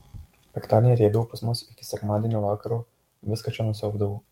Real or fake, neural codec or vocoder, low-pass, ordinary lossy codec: fake; codec, 44.1 kHz, 7.8 kbps, DAC; 19.8 kHz; MP3, 64 kbps